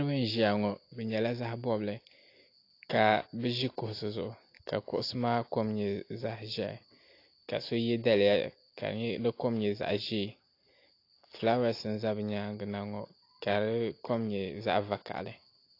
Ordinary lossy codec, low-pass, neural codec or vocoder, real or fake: AAC, 32 kbps; 5.4 kHz; none; real